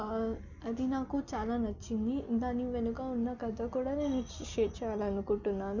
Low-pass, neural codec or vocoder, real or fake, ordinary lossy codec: 7.2 kHz; none; real; none